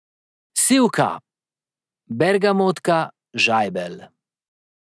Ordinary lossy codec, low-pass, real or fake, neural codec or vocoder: none; none; real; none